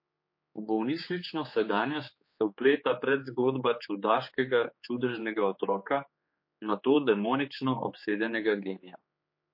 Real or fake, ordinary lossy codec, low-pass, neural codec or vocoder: fake; MP3, 32 kbps; 5.4 kHz; codec, 16 kHz, 4 kbps, X-Codec, HuBERT features, trained on general audio